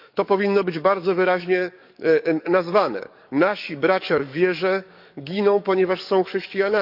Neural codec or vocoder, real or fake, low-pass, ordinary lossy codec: codec, 44.1 kHz, 7.8 kbps, DAC; fake; 5.4 kHz; none